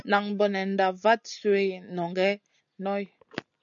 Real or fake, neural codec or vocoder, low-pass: real; none; 7.2 kHz